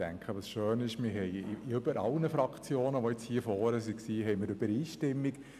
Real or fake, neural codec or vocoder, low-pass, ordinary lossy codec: real; none; 14.4 kHz; none